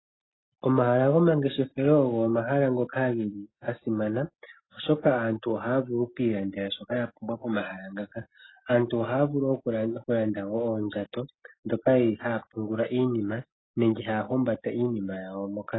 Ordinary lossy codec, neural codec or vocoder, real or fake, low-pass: AAC, 16 kbps; none; real; 7.2 kHz